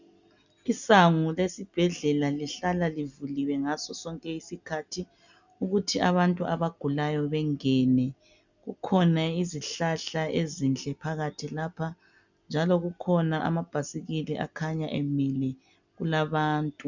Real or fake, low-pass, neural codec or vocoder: real; 7.2 kHz; none